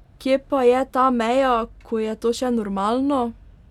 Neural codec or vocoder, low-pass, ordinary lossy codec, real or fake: none; 19.8 kHz; none; real